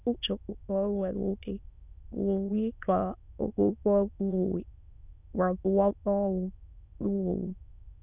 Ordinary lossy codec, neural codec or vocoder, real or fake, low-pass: none; autoencoder, 22.05 kHz, a latent of 192 numbers a frame, VITS, trained on many speakers; fake; 3.6 kHz